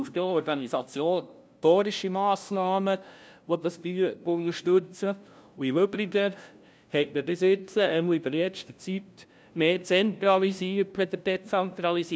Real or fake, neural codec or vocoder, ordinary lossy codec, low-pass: fake; codec, 16 kHz, 0.5 kbps, FunCodec, trained on LibriTTS, 25 frames a second; none; none